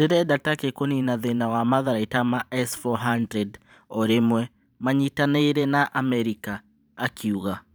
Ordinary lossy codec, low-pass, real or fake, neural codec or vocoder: none; none; fake; vocoder, 44.1 kHz, 128 mel bands every 512 samples, BigVGAN v2